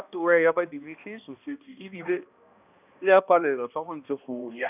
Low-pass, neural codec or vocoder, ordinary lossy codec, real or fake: 3.6 kHz; codec, 16 kHz, 1 kbps, X-Codec, HuBERT features, trained on balanced general audio; none; fake